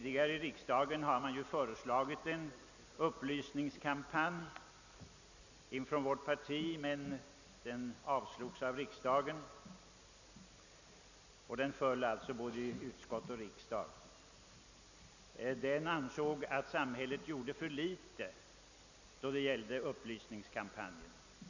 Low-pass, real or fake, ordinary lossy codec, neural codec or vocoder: 7.2 kHz; real; none; none